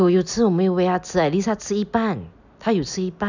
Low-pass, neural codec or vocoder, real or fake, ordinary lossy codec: 7.2 kHz; none; real; none